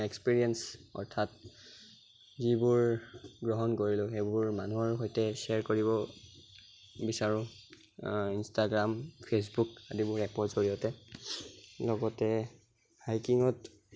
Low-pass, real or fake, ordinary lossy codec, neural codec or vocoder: none; real; none; none